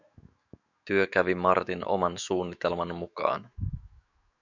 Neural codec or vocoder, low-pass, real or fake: autoencoder, 48 kHz, 128 numbers a frame, DAC-VAE, trained on Japanese speech; 7.2 kHz; fake